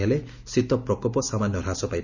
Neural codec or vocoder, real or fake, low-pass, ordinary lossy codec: none; real; 7.2 kHz; none